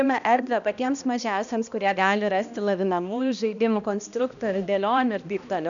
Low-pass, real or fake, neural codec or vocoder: 7.2 kHz; fake; codec, 16 kHz, 1 kbps, X-Codec, HuBERT features, trained on balanced general audio